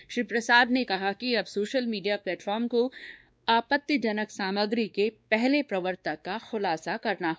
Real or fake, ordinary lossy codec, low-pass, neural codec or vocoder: fake; none; none; codec, 16 kHz, 2 kbps, X-Codec, WavLM features, trained on Multilingual LibriSpeech